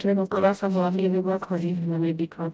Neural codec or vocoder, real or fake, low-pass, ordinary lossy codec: codec, 16 kHz, 0.5 kbps, FreqCodec, smaller model; fake; none; none